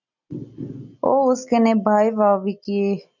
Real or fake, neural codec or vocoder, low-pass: real; none; 7.2 kHz